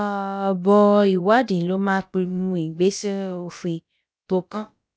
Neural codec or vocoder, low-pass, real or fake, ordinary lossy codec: codec, 16 kHz, about 1 kbps, DyCAST, with the encoder's durations; none; fake; none